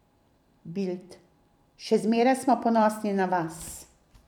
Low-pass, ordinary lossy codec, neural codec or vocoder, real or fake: 19.8 kHz; none; vocoder, 44.1 kHz, 128 mel bands every 256 samples, BigVGAN v2; fake